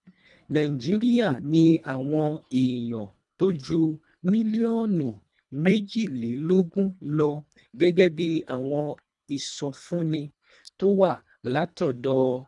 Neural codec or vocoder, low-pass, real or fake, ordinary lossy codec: codec, 24 kHz, 1.5 kbps, HILCodec; 10.8 kHz; fake; none